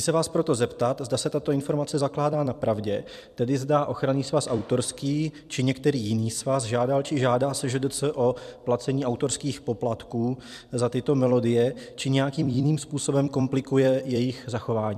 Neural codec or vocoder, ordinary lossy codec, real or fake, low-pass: vocoder, 44.1 kHz, 128 mel bands every 256 samples, BigVGAN v2; MP3, 96 kbps; fake; 14.4 kHz